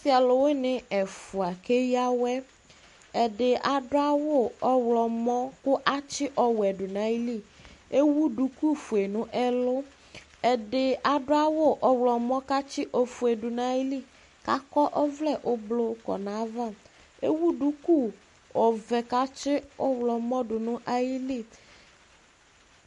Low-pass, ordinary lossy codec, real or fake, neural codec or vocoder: 14.4 kHz; MP3, 48 kbps; real; none